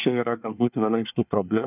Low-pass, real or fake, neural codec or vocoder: 3.6 kHz; fake; codec, 24 kHz, 1 kbps, SNAC